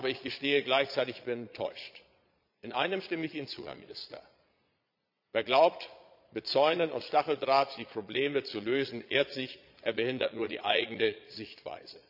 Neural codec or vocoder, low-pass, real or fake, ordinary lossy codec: vocoder, 22.05 kHz, 80 mel bands, Vocos; 5.4 kHz; fake; none